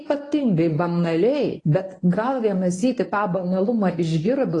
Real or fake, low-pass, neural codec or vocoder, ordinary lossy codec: fake; 10.8 kHz; codec, 24 kHz, 0.9 kbps, WavTokenizer, medium speech release version 1; AAC, 32 kbps